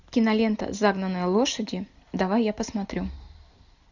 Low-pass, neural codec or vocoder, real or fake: 7.2 kHz; none; real